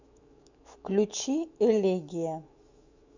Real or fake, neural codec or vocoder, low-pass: fake; autoencoder, 48 kHz, 128 numbers a frame, DAC-VAE, trained on Japanese speech; 7.2 kHz